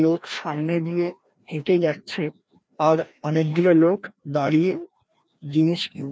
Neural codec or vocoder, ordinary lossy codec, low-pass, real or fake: codec, 16 kHz, 1 kbps, FreqCodec, larger model; none; none; fake